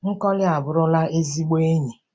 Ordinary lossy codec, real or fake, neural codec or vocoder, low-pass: none; real; none; none